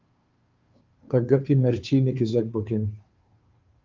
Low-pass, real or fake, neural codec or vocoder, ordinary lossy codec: 7.2 kHz; fake; codec, 16 kHz, 2 kbps, FunCodec, trained on Chinese and English, 25 frames a second; Opus, 32 kbps